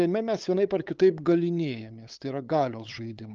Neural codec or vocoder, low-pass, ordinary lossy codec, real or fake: codec, 16 kHz, 8 kbps, FunCodec, trained on LibriTTS, 25 frames a second; 7.2 kHz; Opus, 24 kbps; fake